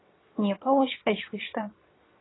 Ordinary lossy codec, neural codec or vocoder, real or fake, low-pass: AAC, 16 kbps; vocoder, 22.05 kHz, 80 mel bands, WaveNeXt; fake; 7.2 kHz